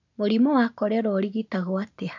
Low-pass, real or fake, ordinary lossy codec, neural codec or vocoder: 7.2 kHz; real; none; none